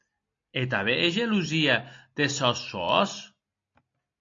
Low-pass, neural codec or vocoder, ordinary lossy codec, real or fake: 7.2 kHz; none; AAC, 48 kbps; real